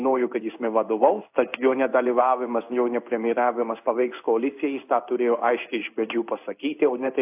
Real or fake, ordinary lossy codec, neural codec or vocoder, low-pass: fake; AAC, 32 kbps; codec, 16 kHz in and 24 kHz out, 1 kbps, XY-Tokenizer; 3.6 kHz